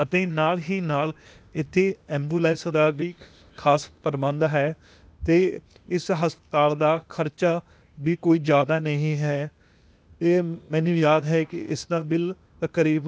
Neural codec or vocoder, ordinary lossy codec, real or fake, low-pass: codec, 16 kHz, 0.8 kbps, ZipCodec; none; fake; none